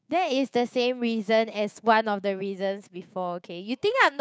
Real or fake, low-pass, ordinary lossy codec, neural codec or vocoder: fake; none; none; codec, 16 kHz, 6 kbps, DAC